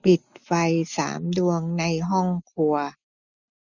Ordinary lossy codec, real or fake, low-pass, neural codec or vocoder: none; real; 7.2 kHz; none